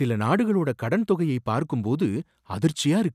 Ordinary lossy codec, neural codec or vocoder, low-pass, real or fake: none; none; 14.4 kHz; real